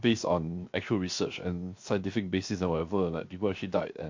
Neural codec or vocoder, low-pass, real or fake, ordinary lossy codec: codec, 16 kHz, 0.7 kbps, FocalCodec; 7.2 kHz; fake; MP3, 48 kbps